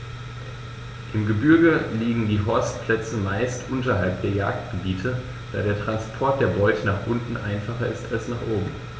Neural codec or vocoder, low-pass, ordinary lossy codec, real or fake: none; none; none; real